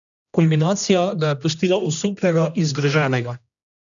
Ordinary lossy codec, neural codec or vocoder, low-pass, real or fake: none; codec, 16 kHz, 1 kbps, X-Codec, HuBERT features, trained on general audio; 7.2 kHz; fake